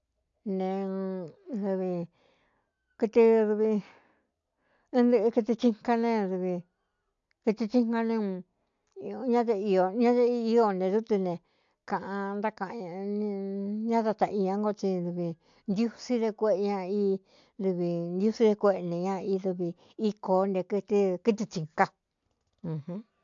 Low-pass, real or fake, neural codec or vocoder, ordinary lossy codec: 7.2 kHz; real; none; AAC, 64 kbps